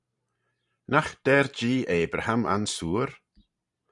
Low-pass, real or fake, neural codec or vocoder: 10.8 kHz; fake; vocoder, 44.1 kHz, 128 mel bands every 512 samples, BigVGAN v2